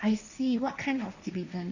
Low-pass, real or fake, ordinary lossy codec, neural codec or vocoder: 7.2 kHz; fake; none; codec, 16 kHz, 1.1 kbps, Voila-Tokenizer